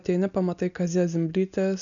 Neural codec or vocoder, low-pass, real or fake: none; 7.2 kHz; real